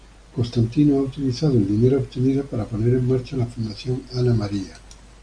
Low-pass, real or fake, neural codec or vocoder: 9.9 kHz; real; none